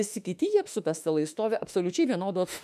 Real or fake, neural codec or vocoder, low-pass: fake; autoencoder, 48 kHz, 32 numbers a frame, DAC-VAE, trained on Japanese speech; 14.4 kHz